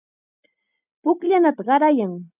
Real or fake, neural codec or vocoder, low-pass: real; none; 3.6 kHz